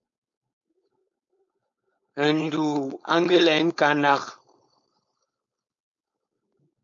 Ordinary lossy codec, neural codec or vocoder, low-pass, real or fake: MP3, 48 kbps; codec, 16 kHz, 4.8 kbps, FACodec; 7.2 kHz; fake